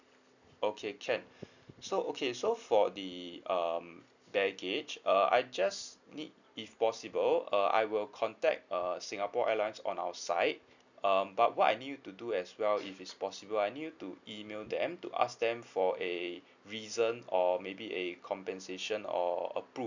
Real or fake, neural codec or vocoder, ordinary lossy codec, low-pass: real; none; none; 7.2 kHz